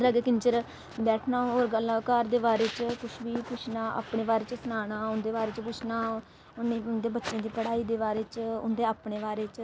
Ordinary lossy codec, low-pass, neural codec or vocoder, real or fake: none; none; none; real